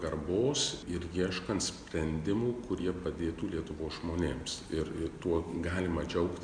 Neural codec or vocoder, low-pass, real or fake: none; 9.9 kHz; real